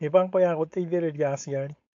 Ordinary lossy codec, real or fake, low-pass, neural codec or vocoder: AAC, 48 kbps; fake; 7.2 kHz; codec, 16 kHz, 4.8 kbps, FACodec